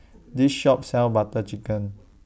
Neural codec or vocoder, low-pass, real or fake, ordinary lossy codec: none; none; real; none